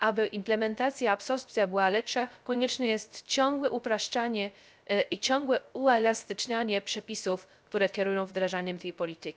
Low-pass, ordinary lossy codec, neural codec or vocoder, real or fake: none; none; codec, 16 kHz, 0.3 kbps, FocalCodec; fake